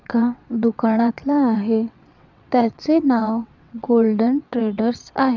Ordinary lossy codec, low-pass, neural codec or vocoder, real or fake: none; 7.2 kHz; vocoder, 22.05 kHz, 80 mel bands, WaveNeXt; fake